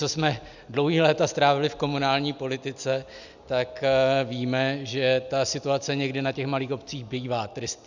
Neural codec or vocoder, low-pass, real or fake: none; 7.2 kHz; real